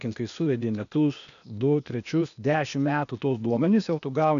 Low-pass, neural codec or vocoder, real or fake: 7.2 kHz; codec, 16 kHz, 0.8 kbps, ZipCodec; fake